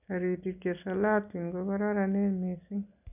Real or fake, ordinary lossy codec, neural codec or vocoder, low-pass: real; none; none; 3.6 kHz